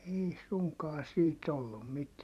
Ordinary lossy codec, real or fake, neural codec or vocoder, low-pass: none; fake; vocoder, 44.1 kHz, 128 mel bands every 512 samples, BigVGAN v2; 14.4 kHz